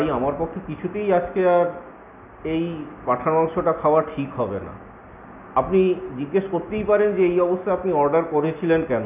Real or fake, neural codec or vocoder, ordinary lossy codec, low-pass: real; none; none; 3.6 kHz